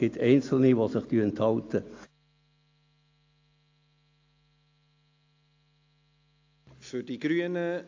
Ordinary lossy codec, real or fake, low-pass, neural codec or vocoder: AAC, 48 kbps; real; 7.2 kHz; none